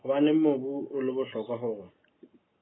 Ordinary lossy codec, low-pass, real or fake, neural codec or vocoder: AAC, 16 kbps; 7.2 kHz; real; none